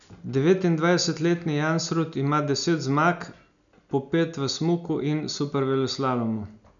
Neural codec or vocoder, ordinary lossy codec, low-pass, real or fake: none; none; 7.2 kHz; real